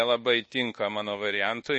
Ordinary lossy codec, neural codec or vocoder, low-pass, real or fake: MP3, 32 kbps; codec, 24 kHz, 1.2 kbps, DualCodec; 10.8 kHz; fake